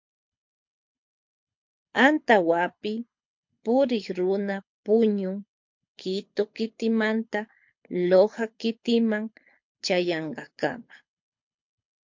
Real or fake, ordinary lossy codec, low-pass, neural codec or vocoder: fake; MP3, 48 kbps; 7.2 kHz; codec, 24 kHz, 6 kbps, HILCodec